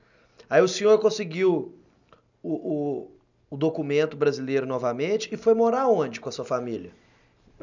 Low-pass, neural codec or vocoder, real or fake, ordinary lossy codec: 7.2 kHz; none; real; none